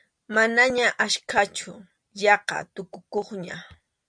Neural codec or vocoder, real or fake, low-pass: none; real; 9.9 kHz